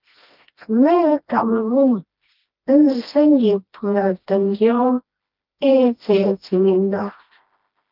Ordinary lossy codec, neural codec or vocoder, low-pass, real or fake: Opus, 32 kbps; codec, 16 kHz, 1 kbps, FreqCodec, smaller model; 5.4 kHz; fake